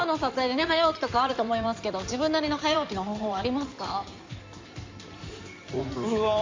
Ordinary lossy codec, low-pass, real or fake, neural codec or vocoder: MP3, 48 kbps; 7.2 kHz; fake; codec, 16 kHz in and 24 kHz out, 2.2 kbps, FireRedTTS-2 codec